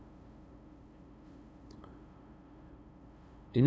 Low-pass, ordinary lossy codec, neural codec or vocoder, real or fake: none; none; codec, 16 kHz, 2 kbps, FunCodec, trained on LibriTTS, 25 frames a second; fake